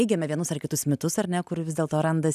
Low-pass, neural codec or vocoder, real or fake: 14.4 kHz; none; real